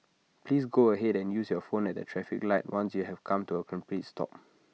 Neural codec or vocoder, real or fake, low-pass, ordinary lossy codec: none; real; none; none